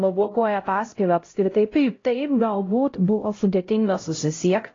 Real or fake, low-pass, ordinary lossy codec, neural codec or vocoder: fake; 7.2 kHz; AAC, 32 kbps; codec, 16 kHz, 0.5 kbps, X-Codec, HuBERT features, trained on LibriSpeech